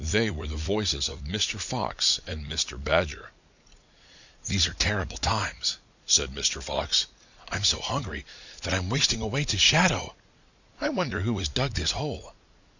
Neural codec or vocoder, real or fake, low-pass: vocoder, 44.1 kHz, 80 mel bands, Vocos; fake; 7.2 kHz